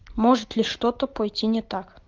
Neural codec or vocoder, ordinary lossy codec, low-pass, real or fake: none; Opus, 24 kbps; 7.2 kHz; real